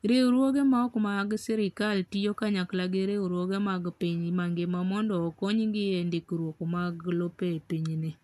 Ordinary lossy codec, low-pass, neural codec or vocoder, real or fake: none; 14.4 kHz; none; real